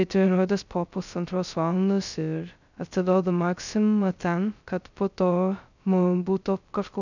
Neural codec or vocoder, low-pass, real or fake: codec, 16 kHz, 0.2 kbps, FocalCodec; 7.2 kHz; fake